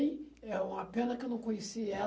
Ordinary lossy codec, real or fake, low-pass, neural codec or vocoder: none; real; none; none